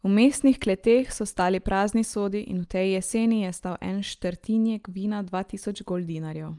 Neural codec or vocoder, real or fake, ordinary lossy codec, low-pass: none; real; Opus, 32 kbps; 10.8 kHz